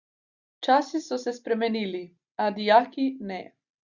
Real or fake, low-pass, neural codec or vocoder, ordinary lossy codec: real; 7.2 kHz; none; Opus, 64 kbps